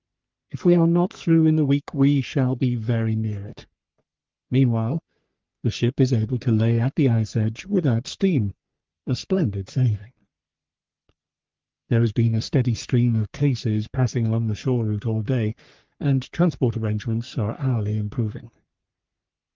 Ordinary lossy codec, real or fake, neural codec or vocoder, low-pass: Opus, 32 kbps; fake; codec, 44.1 kHz, 3.4 kbps, Pupu-Codec; 7.2 kHz